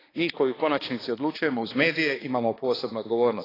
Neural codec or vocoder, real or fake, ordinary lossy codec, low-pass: codec, 16 kHz, 2 kbps, X-Codec, HuBERT features, trained on balanced general audio; fake; AAC, 24 kbps; 5.4 kHz